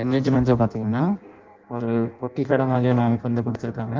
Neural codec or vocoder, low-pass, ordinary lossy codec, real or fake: codec, 16 kHz in and 24 kHz out, 0.6 kbps, FireRedTTS-2 codec; 7.2 kHz; Opus, 32 kbps; fake